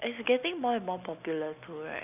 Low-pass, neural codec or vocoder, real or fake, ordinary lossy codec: 3.6 kHz; none; real; none